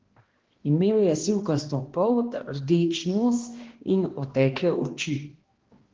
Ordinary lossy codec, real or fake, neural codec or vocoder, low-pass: Opus, 16 kbps; fake; codec, 16 kHz, 1 kbps, X-Codec, HuBERT features, trained on balanced general audio; 7.2 kHz